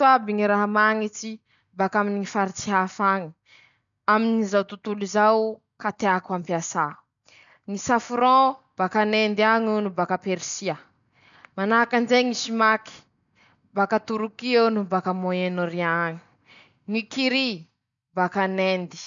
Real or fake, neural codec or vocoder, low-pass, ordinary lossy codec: real; none; 7.2 kHz; none